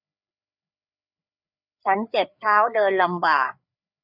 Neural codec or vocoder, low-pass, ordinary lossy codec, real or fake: codec, 16 kHz, 4 kbps, FreqCodec, larger model; 5.4 kHz; none; fake